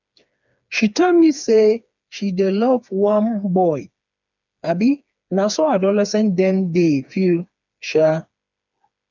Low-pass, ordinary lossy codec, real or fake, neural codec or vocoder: 7.2 kHz; none; fake; codec, 16 kHz, 4 kbps, FreqCodec, smaller model